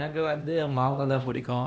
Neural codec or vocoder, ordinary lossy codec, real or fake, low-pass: codec, 16 kHz, 1 kbps, X-Codec, HuBERT features, trained on LibriSpeech; none; fake; none